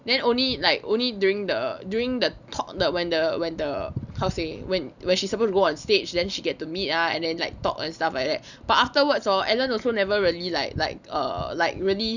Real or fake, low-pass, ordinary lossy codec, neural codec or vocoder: real; 7.2 kHz; none; none